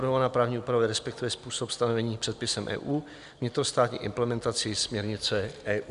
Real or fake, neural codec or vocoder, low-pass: real; none; 10.8 kHz